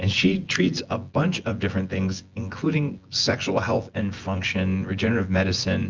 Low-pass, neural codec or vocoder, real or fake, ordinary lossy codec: 7.2 kHz; vocoder, 24 kHz, 100 mel bands, Vocos; fake; Opus, 24 kbps